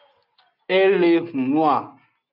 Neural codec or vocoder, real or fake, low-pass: none; real; 5.4 kHz